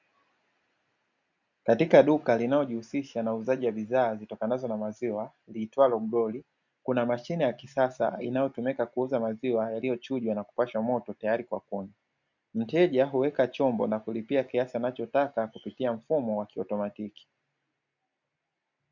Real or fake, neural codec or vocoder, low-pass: real; none; 7.2 kHz